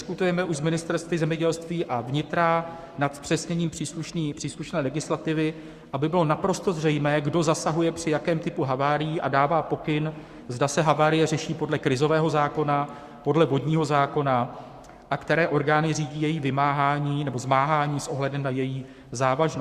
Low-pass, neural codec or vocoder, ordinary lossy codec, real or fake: 14.4 kHz; codec, 44.1 kHz, 7.8 kbps, Pupu-Codec; Opus, 64 kbps; fake